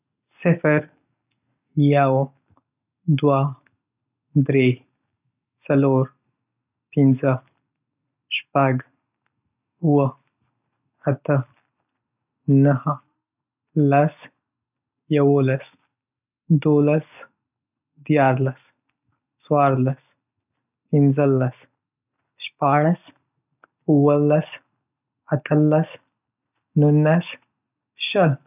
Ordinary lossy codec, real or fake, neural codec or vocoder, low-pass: none; real; none; 3.6 kHz